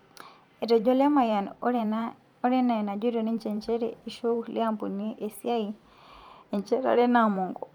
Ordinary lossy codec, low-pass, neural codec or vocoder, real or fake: none; 19.8 kHz; vocoder, 44.1 kHz, 128 mel bands every 512 samples, BigVGAN v2; fake